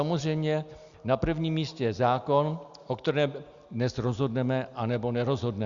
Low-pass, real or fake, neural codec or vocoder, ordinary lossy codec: 7.2 kHz; real; none; Opus, 64 kbps